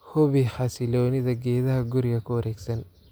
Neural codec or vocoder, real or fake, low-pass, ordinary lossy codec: none; real; none; none